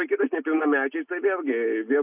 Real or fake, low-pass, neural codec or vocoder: real; 3.6 kHz; none